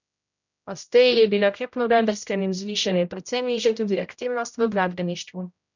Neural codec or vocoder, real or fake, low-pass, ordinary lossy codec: codec, 16 kHz, 0.5 kbps, X-Codec, HuBERT features, trained on general audio; fake; 7.2 kHz; none